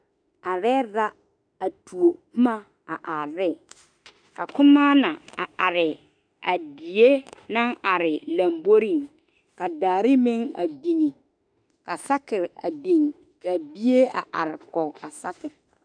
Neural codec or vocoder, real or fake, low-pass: autoencoder, 48 kHz, 32 numbers a frame, DAC-VAE, trained on Japanese speech; fake; 9.9 kHz